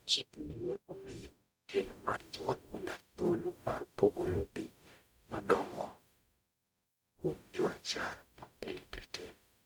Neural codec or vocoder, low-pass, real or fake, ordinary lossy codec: codec, 44.1 kHz, 0.9 kbps, DAC; none; fake; none